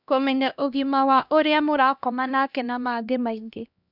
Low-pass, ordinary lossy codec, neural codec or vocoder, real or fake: 5.4 kHz; none; codec, 16 kHz, 1 kbps, X-Codec, HuBERT features, trained on LibriSpeech; fake